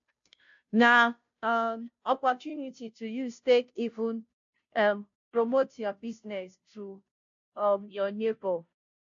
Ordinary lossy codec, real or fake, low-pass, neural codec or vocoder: AAC, 64 kbps; fake; 7.2 kHz; codec, 16 kHz, 0.5 kbps, FunCodec, trained on Chinese and English, 25 frames a second